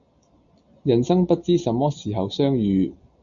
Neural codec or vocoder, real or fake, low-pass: none; real; 7.2 kHz